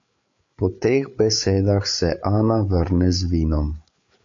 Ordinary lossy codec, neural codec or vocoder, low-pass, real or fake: AAC, 64 kbps; codec, 16 kHz, 8 kbps, FreqCodec, larger model; 7.2 kHz; fake